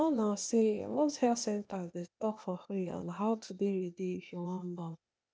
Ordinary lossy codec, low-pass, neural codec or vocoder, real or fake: none; none; codec, 16 kHz, 0.8 kbps, ZipCodec; fake